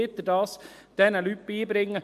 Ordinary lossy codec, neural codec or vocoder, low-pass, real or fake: MP3, 64 kbps; none; 14.4 kHz; real